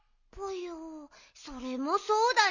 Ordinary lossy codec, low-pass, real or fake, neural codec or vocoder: none; 7.2 kHz; real; none